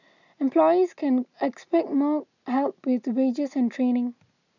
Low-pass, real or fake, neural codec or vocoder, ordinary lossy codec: 7.2 kHz; real; none; none